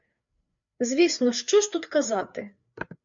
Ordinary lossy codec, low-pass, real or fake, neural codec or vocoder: MP3, 64 kbps; 7.2 kHz; fake; codec, 16 kHz, 4 kbps, FreqCodec, larger model